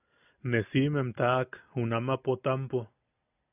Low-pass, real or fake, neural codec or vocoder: 3.6 kHz; real; none